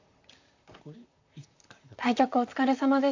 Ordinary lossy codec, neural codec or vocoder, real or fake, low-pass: AAC, 48 kbps; vocoder, 44.1 kHz, 128 mel bands, Pupu-Vocoder; fake; 7.2 kHz